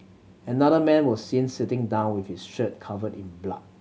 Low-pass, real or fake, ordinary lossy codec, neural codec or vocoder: none; real; none; none